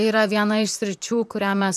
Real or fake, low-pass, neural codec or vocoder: fake; 14.4 kHz; vocoder, 44.1 kHz, 128 mel bands, Pupu-Vocoder